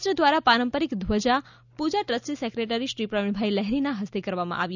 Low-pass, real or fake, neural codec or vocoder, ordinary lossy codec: 7.2 kHz; real; none; none